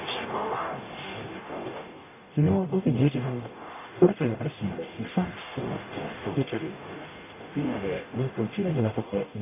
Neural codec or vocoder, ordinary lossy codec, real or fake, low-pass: codec, 44.1 kHz, 0.9 kbps, DAC; none; fake; 3.6 kHz